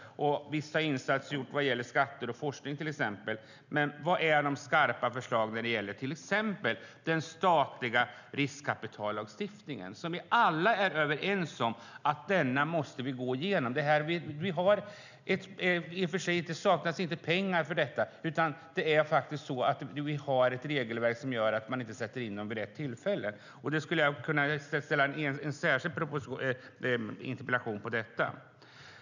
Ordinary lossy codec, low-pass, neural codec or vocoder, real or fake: none; 7.2 kHz; none; real